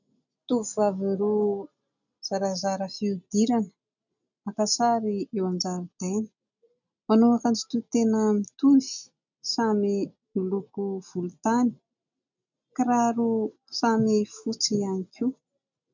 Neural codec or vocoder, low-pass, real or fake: none; 7.2 kHz; real